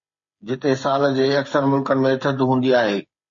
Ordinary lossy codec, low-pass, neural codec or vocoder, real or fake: MP3, 32 kbps; 7.2 kHz; codec, 16 kHz, 8 kbps, FreqCodec, smaller model; fake